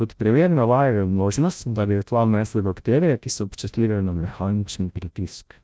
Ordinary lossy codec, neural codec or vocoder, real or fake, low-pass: none; codec, 16 kHz, 0.5 kbps, FreqCodec, larger model; fake; none